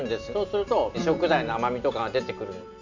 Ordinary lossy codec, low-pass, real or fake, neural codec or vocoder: none; 7.2 kHz; real; none